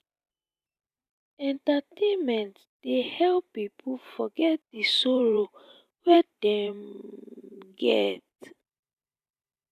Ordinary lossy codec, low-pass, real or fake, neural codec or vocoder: none; 14.4 kHz; fake; vocoder, 44.1 kHz, 128 mel bands every 512 samples, BigVGAN v2